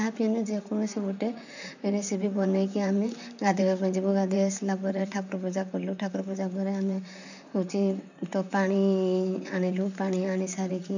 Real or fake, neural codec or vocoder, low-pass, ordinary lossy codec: fake; vocoder, 44.1 kHz, 128 mel bands, Pupu-Vocoder; 7.2 kHz; none